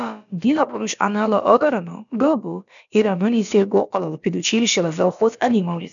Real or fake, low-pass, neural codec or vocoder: fake; 7.2 kHz; codec, 16 kHz, about 1 kbps, DyCAST, with the encoder's durations